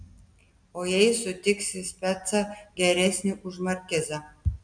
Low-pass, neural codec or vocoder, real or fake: 9.9 kHz; none; real